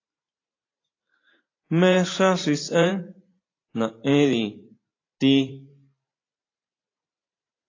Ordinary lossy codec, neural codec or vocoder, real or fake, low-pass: AAC, 32 kbps; vocoder, 44.1 kHz, 128 mel bands every 512 samples, BigVGAN v2; fake; 7.2 kHz